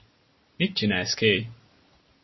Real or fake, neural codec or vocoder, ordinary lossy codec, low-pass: real; none; MP3, 24 kbps; 7.2 kHz